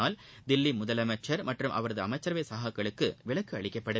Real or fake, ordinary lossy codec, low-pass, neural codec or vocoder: real; none; none; none